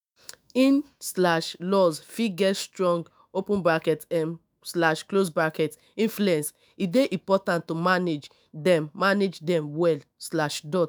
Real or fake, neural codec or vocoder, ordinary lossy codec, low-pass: fake; autoencoder, 48 kHz, 128 numbers a frame, DAC-VAE, trained on Japanese speech; none; none